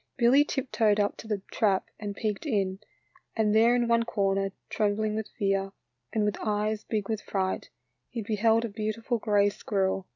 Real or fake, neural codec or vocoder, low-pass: real; none; 7.2 kHz